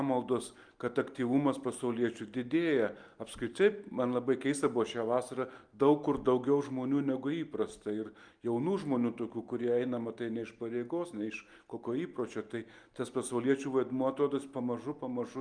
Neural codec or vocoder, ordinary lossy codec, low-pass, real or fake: none; Opus, 32 kbps; 9.9 kHz; real